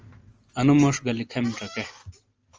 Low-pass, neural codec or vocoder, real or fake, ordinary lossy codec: 7.2 kHz; none; real; Opus, 24 kbps